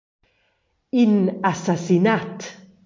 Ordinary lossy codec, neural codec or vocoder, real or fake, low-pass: MP3, 48 kbps; none; real; 7.2 kHz